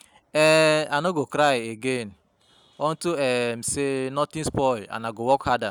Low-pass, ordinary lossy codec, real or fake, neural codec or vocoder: 19.8 kHz; none; real; none